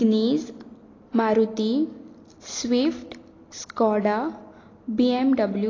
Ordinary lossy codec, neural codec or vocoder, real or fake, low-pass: AAC, 32 kbps; none; real; 7.2 kHz